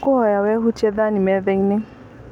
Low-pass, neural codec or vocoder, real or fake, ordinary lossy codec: 19.8 kHz; none; real; none